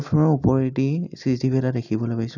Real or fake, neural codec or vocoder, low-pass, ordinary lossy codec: real; none; 7.2 kHz; none